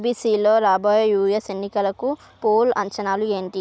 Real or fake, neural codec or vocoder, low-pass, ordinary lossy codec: real; none; none; none